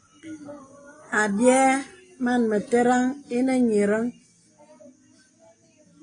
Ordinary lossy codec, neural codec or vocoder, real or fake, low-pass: AAC, 32 kbps; none; real; 9.9 kHz